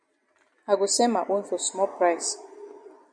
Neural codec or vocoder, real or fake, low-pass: none; real; 9.9 kHz